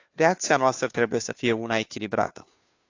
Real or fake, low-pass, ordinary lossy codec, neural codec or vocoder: fake; 7.2 kHz; AAC, 48 kbps; codec, 16 kHz, 2 kbps, FunCodec, trained on Chinese and English, 25 frames a second